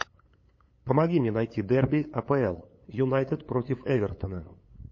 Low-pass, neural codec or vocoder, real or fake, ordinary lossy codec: 7.2 kHz; codec, 16 kHz, 8 kbps, FunCodec, trained on LibriTTS, 25 frames a second; fake; MP3, 32 kbps